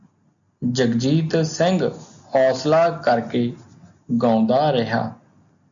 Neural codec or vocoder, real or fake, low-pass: none; real; 7.2 kHz